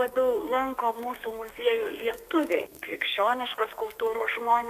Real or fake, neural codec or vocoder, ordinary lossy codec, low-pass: fake; codec, 32 kHz, 1.9 kbps, SNAC; AAC, 96 kbps; 14.4 kHz